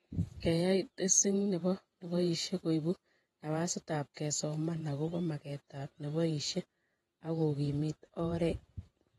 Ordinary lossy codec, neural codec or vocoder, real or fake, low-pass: AAC, 32 kbps; vocoder, 48 kHz, 128 mel bands, Vocos; fake; 19.8 kHz